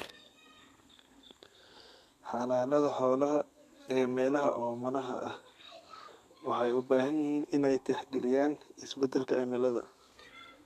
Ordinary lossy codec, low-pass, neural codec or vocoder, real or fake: none; 14.4 kHz; codec, 32 kHz, 1.9 kbps, SNAC; fake